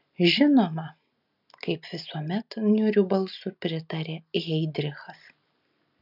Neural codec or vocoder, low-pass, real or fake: none; 5.4 kHz; real